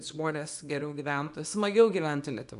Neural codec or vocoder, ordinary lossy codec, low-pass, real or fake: codec, 24 kHz, 0.9 kbps, WavTokenizer, small release; MP3, 96 kbps; 10.8 kHz; fake